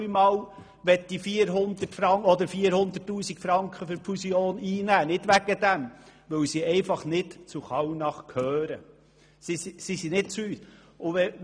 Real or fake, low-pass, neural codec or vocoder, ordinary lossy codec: real; 9.9 kHz; none; none